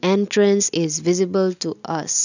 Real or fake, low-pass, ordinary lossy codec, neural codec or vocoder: real; 7.2 kHz; none; none